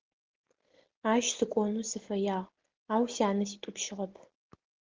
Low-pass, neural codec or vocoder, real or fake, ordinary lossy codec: 7.2 kHz; none; real; Opus, 16 kbps